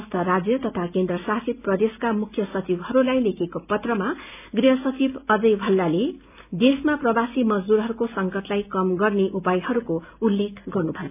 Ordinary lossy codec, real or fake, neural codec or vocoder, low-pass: none; real; none; 3.6 kHz